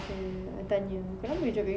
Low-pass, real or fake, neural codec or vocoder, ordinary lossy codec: none; real; none; none